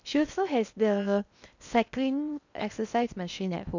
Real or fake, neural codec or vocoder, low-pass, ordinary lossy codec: fake; codec, 16 kHz in and 24 kHz out, 0.6 kbps, FocalCodec, streaming, 4096 codes; 7.2 kHz; none